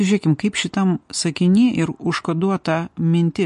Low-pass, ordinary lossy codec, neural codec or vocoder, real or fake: 14.4 kHz; MP3, 48 kbps; none; real